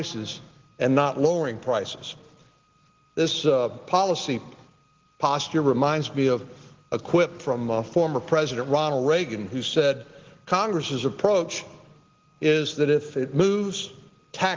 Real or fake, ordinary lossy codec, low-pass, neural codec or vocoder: real; Opus, 16 kbps; 7.2 kHz; none